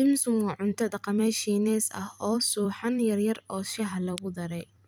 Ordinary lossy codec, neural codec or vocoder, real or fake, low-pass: none; vocoder, 44.1 kHz, 128 mel bands every 256 samples, BigVGAN v2; fake; none